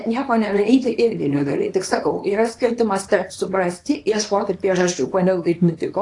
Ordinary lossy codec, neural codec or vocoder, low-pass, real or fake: AAC, 48 kbps; codec, 24 kHz, 0.9 kbps, WavTokenizer, small release; 10.8 kHz; fake